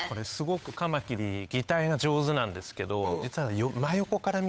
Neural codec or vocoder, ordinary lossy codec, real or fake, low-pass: codec, 16 kHz, 8 kbps, FunCodec, trained on Chinese and English, 25 frames a second; none; fake; none